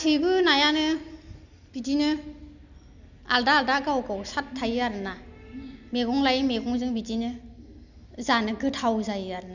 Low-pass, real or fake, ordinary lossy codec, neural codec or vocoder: 7.2 kHz; real; none; none